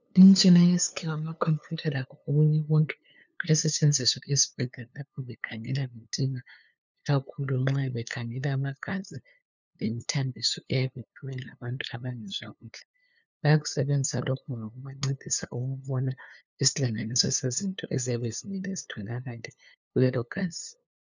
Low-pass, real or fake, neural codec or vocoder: 7.2 kHz; fake; codec, 16 kHz, 2 kbps, FunCodec, trained on LibriTTS, 25 frames a second